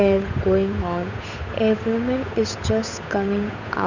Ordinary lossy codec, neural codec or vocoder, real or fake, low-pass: none; codec, 16 kHz, 8 kbps, FunCodec, trained on Chinese and English, 25 frames a second; fake; 7.2 kHz